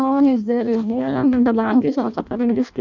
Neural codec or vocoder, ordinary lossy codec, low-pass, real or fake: codec, 16 kHz in and 24 kHz out, 0.6 kbps, FireRedTTS-2 codec; none; 7.2 kHz; fake